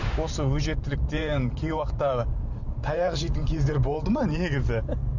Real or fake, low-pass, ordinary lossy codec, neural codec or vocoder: fake; 7.2 kHz; none; vocoder, 44.1 kHz, 128 mel bands every 512 samples, BigVGAN v2